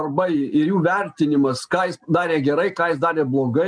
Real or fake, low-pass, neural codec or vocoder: real; 9.9 kHz; none